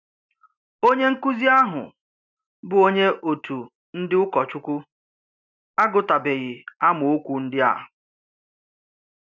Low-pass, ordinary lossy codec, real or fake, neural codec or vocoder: 7.2 kHz; none; real; none